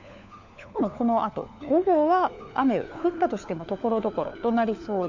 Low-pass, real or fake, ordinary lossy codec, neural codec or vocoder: 7.2 kHz; fake; none; codec, 16 kHz, 4 kbps, FunCodec, trained on LibriTTS, 50 frames a second